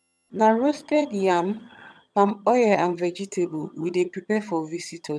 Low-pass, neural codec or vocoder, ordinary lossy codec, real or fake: none; vocoder, 22.05 kHz, 80 mel bands, HiFi-GAN; none; fake